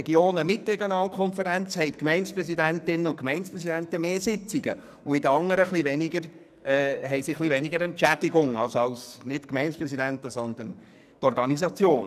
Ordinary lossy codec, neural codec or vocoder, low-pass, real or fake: none; codec, 44.1 kHz, 2.6 kbps, SNAC; 14.4 kHz; fake